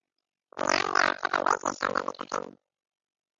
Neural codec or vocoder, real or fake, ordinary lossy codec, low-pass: none; real; AAC, 48 kbps; 7.2 kHz